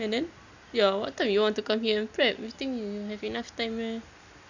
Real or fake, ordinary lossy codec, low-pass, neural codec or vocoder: real; none; 7.2 kHz; none